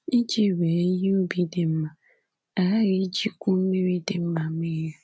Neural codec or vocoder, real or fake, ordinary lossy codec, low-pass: none; real; none; none